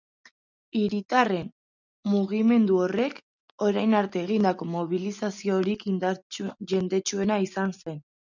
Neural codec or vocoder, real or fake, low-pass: none; real; 7.2 kHz